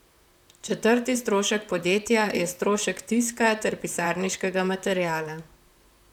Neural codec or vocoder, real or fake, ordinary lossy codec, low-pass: vocoder, 44.1 kHz, 128 mel bands, Pupu-Vocoder; fake; none; 19.8 kHz